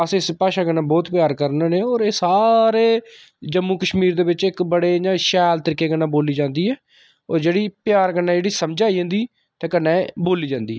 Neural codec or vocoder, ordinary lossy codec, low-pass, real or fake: none; none; none; real